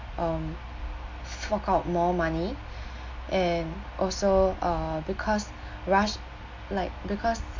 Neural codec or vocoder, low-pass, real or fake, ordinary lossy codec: none; 7.2 kHz; real; MP3, 48 kbps